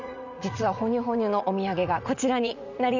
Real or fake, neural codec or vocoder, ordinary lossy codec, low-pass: fake; vocoder, 44.1 kHz, 80 mel bands, Vocos; none; 7.2 kHz